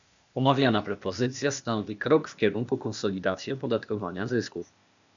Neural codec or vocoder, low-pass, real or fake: codec, 16 kHz, 0.8 kbps, ZipCodec; 7.2 kHz; fake